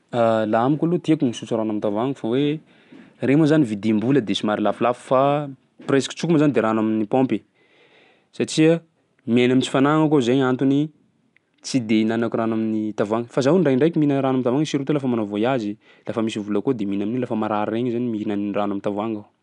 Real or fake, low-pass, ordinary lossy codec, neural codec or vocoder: real; 10.8 kHz; none; none